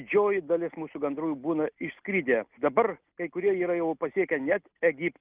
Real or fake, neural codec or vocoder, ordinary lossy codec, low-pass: real; none; Opus, 32 kbps; 3.6 kHz